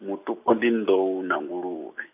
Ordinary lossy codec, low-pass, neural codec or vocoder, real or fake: none; 3.6 kHz; none; real